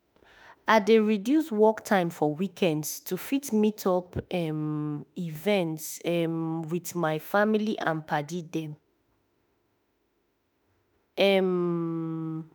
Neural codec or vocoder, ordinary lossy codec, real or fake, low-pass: autoencoder, 48 kHz, 32 numbers a frame, DAC-VAE, trained on Japanese speech; none; fake; none